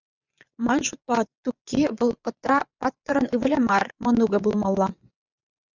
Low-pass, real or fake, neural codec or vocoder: 7.2 kHz; fake; vocoder, 24 kHz, 100 mel bands, Vocos